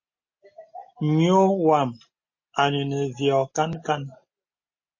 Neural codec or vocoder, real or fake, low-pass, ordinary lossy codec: none; real; 7.2 kHz; MP3, 32 kbps